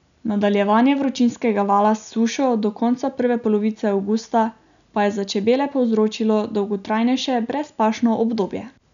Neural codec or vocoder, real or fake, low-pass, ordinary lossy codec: none; real; 7.2 kHz; none